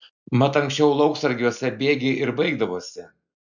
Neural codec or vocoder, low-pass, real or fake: none; 7.2 kHz; real